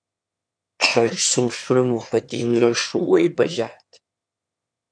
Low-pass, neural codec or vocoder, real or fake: 9.9 kHz; autoencoder, 22.05 kHz, a latent of 192 numbers a frame, VITS, trained on one speaker; fake